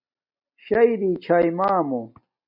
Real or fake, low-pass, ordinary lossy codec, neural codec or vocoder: real; 5.4 kHz; AAC, 48 kbps; none